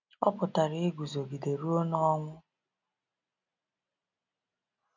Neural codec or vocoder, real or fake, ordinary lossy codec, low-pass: none; real; none; 7.2 kHz